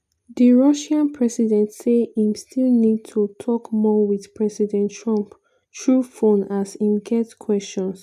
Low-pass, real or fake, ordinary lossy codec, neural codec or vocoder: 14.4 kHz; real; none; none